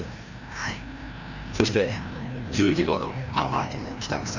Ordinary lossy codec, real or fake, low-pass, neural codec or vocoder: none; fake; 7.2 kHz; codec, 16 kHz, 1 kbps, FreqCodec, larger model